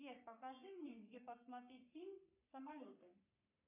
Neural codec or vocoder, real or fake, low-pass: codec, 44.1 kHz, 3.4 kbps, Pupu-Codec; fake; 3.6 kHz